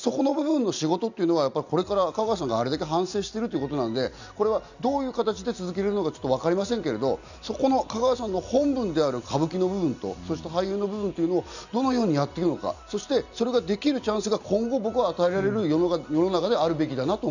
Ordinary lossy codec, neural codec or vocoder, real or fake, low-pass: none; none; real; 7.2 kHz